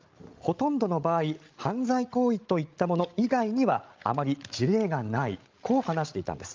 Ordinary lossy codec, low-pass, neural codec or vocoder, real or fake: Opus, 24 kbps; 7.2 kHz; codec, 16 kHz, 16 kbps, FreqCodec, larger model; fake